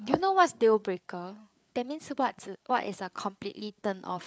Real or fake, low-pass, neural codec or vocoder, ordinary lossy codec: fake; none; codec, 16 kHz, 8 kbps, FreqCodec, larger model; none